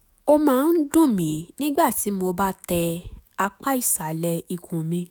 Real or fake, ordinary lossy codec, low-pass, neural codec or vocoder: fake; none; none; autoencoder, 48 kHz, 128 numbers a frame, DAC-VAE, trained on Japanese speech